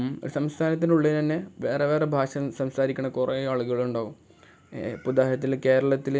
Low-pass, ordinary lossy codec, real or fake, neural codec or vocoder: none; none; real; none